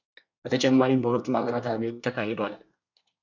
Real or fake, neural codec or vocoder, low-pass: fake; codec, 24 kHz, 1 kbps, SNAC; 7.2 kHz